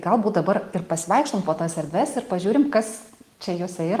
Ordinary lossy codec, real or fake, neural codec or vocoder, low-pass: Opus, 24 kbps; real; none; 14.4 kHz